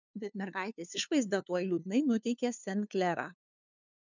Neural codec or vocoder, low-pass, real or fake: codec, 16 kHz, 2 kbps, FunCodec, trained on LibriTTS, 25 frames a second; 7.2 kHz; fake